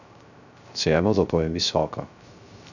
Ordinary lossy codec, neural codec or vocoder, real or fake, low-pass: none; codec, 16 kHz, 0.3 kbps, FocalCodec; fake; 7.2 kHz